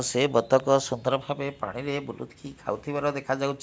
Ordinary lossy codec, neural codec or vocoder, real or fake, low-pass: none; none; real; none